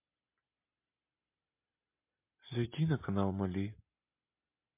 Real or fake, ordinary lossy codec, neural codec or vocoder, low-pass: real; MP3, 24 kbps; none; 3.6 kHz